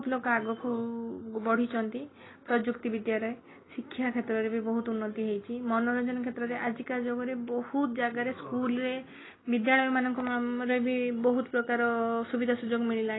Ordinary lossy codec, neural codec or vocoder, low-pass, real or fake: AAC, 16 kbps; none; 7.2 kHz; real